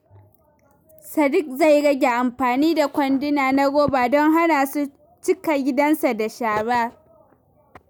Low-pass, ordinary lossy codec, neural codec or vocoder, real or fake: none; none; none; real